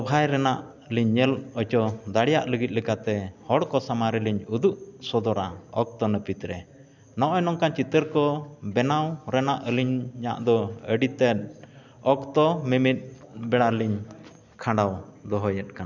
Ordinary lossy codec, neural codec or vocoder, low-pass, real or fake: none; none; 7.2 kHz; real